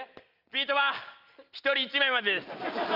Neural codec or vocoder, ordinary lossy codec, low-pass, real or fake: none; Opus, 24 kbps; 5.4 kHz; real